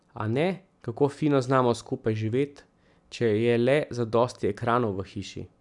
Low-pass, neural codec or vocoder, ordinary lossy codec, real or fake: 10.8 kHz; none; none; real